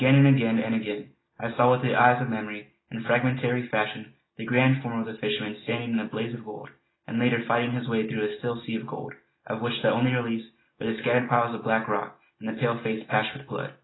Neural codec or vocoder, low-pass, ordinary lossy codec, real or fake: none; 7.2 kHz; AAC, 16 kbps; real